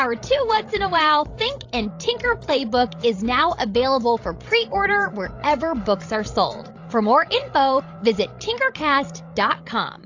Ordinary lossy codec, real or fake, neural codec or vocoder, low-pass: AAC, 48 kbps; fake; codec, 16 kHz, 16 kbps, FreqCodec, larger model; 7.2 kHz